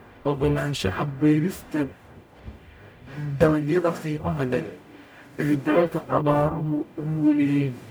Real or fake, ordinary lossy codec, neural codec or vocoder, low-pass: fake; none; codec, 44.1 kHz, 0.9 kbps, DAC; none